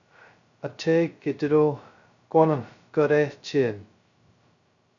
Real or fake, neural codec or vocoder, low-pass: fake; codec, 16 kHz, 0.2 kbps, FocalCodec; 7.2 kHz